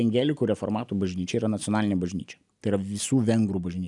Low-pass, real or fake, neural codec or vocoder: 10.8 kHz; real; none